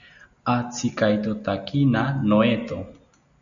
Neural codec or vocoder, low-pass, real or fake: none; 7.2 kHz; real